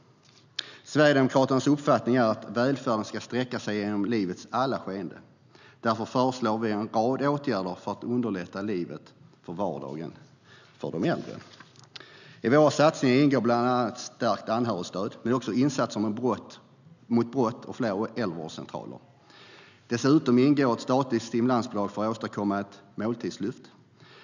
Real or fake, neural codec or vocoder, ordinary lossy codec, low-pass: real; none; none; 7.2 kHz